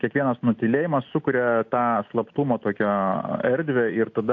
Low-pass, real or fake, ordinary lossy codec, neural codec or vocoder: 7.2 kHz; real; AAC, 48 kbps; none